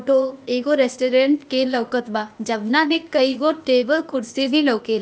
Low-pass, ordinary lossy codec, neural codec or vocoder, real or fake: none; none; codec, 16 kHz, 0.8 kbps, ZipCodec; fake